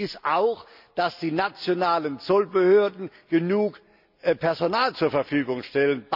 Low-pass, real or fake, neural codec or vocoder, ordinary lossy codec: 5.4 kHz; real; none; none